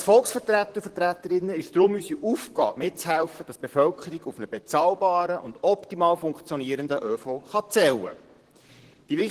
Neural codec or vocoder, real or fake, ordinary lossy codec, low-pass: vocoder, 44.1 kHz, 128 mel bands, Pupu-Vocoder; fake; Opus, 16 kbps; 14.4 kHz